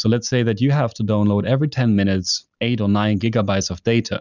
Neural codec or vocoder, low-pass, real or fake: none; 7.2 kHz; real